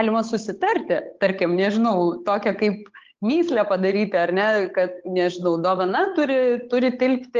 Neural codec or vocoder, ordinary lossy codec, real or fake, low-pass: codec, 16 kHz, 8 kbps, FunCodec, trained on Chinese and English, 25 frames a second; Opus, 24 kbps; fake; 7.2 kHz